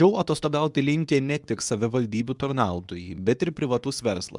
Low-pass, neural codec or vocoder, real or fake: 10.8 kHz; codec, 24 kHz, 0.9 kbps, WavTokenizer, medium speech release version 2; fake